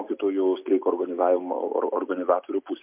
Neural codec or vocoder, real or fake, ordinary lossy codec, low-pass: none; real; MP3, 32 kbps; 3.6 kHz